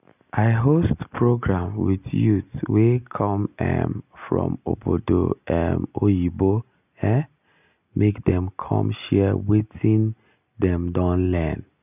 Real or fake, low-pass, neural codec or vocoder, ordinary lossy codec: real; 3.6 kHz; none; none